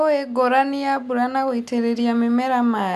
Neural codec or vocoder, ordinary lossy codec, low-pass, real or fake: none; none; 14.4 kHz; real